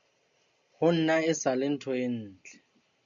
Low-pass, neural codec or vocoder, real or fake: 7.2 kHz; none; real